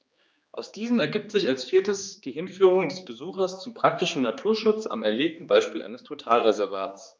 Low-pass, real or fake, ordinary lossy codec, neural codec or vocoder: none; fake; none; codec, 16 kHz, 2 kbps, X-Codec, HuBERT features, trained on general audio